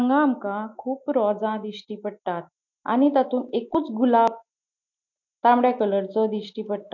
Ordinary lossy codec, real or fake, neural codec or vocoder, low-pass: none; real; none; 7.2 kHz